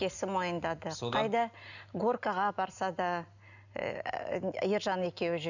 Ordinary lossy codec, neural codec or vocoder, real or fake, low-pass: none; none; real; 7.2 kHz